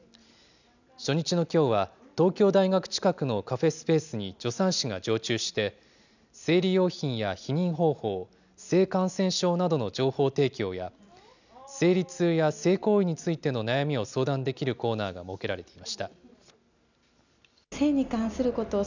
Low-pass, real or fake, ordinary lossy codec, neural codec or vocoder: 7.2 kHz; real; none; none